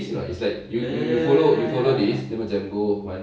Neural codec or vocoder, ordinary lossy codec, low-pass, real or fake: none; none; none; real